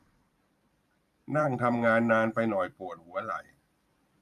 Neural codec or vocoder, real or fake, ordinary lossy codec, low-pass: vocoder, 44.1 kHz, 128 mel bands every 512 samples, BigVGAN v2; fake; none; 14.4 kHz